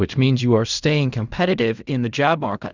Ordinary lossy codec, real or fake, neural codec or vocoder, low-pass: Opus, 64 kbps; fake; codec, 16 kHz in and 24 kHz out, 0.4 kbps, LongCat-Audio-Codec, fine tuned four codebook decoder; 7.2 kHz